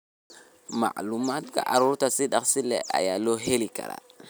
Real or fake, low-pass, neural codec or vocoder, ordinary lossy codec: real; none; none; none